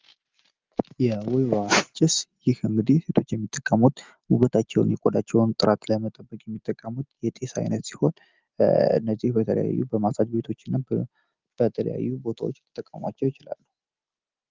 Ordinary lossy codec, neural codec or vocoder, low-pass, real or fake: Opus, 32 kbps; none; 7.2 kHz; real